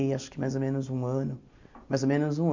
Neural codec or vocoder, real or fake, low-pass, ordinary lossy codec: none; real; 7.2 kHz; MP3, 48 kbps